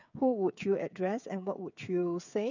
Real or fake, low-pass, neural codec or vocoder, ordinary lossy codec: fake; 7.2 kHz; codec, 16 kHz, 8 kbps, FreqCodec, smaller model; none